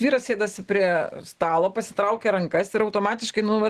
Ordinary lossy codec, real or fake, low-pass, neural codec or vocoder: Opus, 16 kbps; real; 14.4 kHz; none